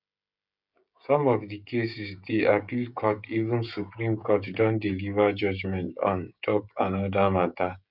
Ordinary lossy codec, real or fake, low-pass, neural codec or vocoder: none; fake; 5.4 kHz; codec, 16 kHz, 16 kbps, FreqCodec, smaller model